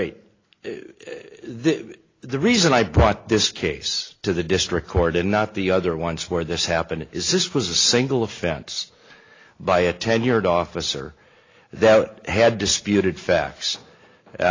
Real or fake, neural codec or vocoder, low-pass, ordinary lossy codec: real; none; 7.2 kHz; AAC, 32 kbps